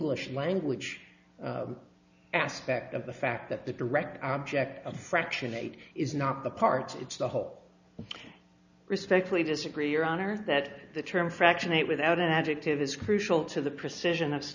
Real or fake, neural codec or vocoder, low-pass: real; none; 7.2 kHz